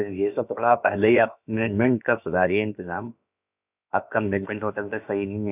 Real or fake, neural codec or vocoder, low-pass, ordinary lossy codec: fake; codec, 16 kHz, about 1 kbps, DyCAST, with the encoder's durations; 3.6 kHz; none